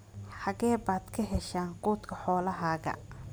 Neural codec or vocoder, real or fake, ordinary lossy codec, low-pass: none; real; none; none